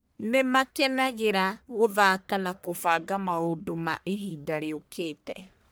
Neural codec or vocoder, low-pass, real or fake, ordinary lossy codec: codec, 44.1 kHz, 1.7 kbps, Pupu-Codec; none; fake; none